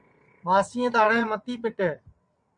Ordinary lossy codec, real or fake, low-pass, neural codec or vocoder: MP3, 64 kbps; fake; 9.9 kHz; vocoder, 22.05 kHz, 80 mel bands, WaveNeXt